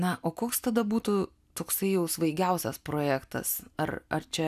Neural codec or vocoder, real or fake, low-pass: none; real; 14.4 kHz